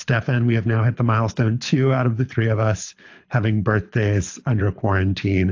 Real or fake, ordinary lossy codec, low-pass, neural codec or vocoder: fake; AAC, 48 kbps; 7.2 kHz; codec, 24 kHz, 6 kbps, HILCodec